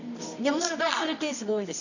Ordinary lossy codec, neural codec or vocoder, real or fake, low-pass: none; codec, 24 kHz, 0.9 kbps, WavTokenizer, medium music audio release; fake; 7.2 kHz